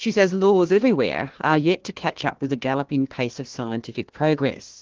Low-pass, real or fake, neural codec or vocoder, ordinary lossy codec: 7.2 kHz; fake; codec, 16 kHz, 1 kbps, FunCodec, trained on Chinese and English, 50 frames a second; Opus, 16 kbps